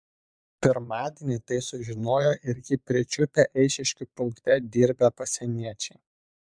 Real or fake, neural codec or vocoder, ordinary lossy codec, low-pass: fake; vocoder, 22.05 kHz, 80 mel bands, Vocos; MP3, 96 kbps; 9.9 kHz